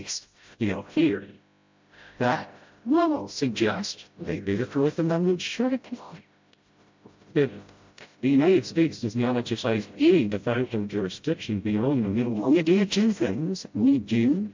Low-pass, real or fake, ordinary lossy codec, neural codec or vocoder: 7.2 kHz; fake; MP3, 48 kbps; codec, 16 kHz, 0.5 kbps, FreqCodec, smaller model